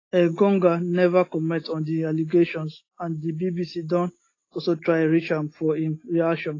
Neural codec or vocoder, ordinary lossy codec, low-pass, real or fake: none; AAC, 32 kbps; 7.2 kHz; real